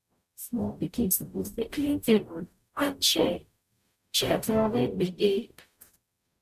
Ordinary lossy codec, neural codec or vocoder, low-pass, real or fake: none; codec, 44.1 kHz, 0.9 kbps, DAC; 14.4 kHz; fake